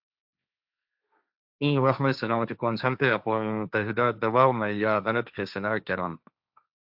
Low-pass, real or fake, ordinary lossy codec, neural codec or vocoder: 5.4 kHz; fake; AAC, 48 kbps; codec, 16 kHz, 1.1 kbps, Voila-Tokenizer